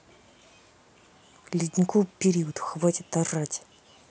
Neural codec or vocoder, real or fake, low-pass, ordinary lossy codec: none; real; none; none